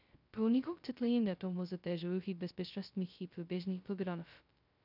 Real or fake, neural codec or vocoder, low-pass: fake; codec, 16 kHz, 0.2 kbps, FocalCodec; 5.4 kHz